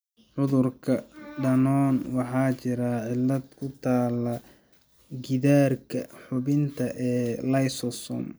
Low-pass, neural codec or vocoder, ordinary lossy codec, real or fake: none; none; none; real